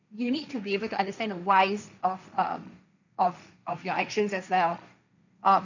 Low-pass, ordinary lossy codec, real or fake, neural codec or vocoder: 7.2 kHz; none; fake; codec, 16 kHz, 1.1 kbps, Voila-Tokenizer